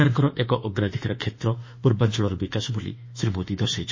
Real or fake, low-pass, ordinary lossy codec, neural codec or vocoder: fake; 7.2 kHz; MP3, 32 kbps; autoencoder, 48 kHz, 32 numbers a frame, DAC-VAE, trained on Japanese speech